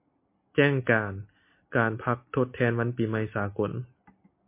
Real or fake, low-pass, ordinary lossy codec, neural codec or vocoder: real; 3.6 kHz; MP3, 24 kbps; none